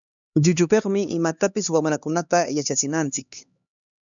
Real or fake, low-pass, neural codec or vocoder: fake; 7.2 kHz; codec, 16 kHz, 2 kbps, X-Codec, HuBERT features, trained on LibriSpeech